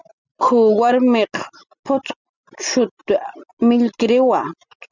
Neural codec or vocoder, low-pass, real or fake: none; 7.2 kHz; real